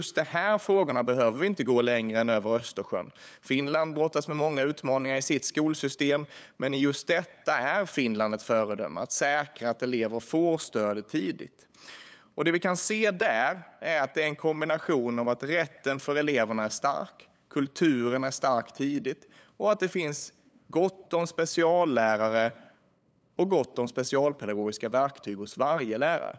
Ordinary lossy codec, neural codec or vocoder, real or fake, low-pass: none; codec, 16 kHz, 8 kbps, FunCodec, trained on LibriTTS, 25 frames a second; fake; none